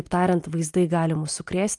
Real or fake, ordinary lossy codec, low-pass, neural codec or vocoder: real; Opus, 32 kbps; 10.8 kHz; none